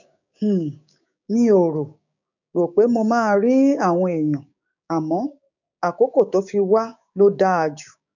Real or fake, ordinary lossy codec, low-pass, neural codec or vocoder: fake; none; 7.2 kHz; codec, 16 kHz, 6 kbps, DAC